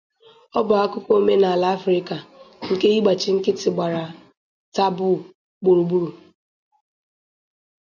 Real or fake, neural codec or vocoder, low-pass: real; none; 7.2 kHz